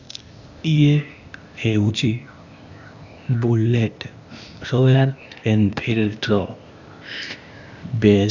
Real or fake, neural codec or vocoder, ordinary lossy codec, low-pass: fake; codec, 16 kHz, 0.8 kbps, ZipCodec; none; 7.2 kHz